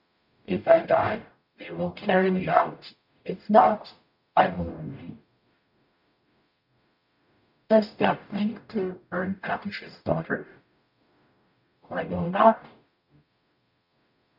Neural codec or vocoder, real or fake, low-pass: codec, 44.1 kHz, 0.9 kbps, DAC; fake; 5.4 kHz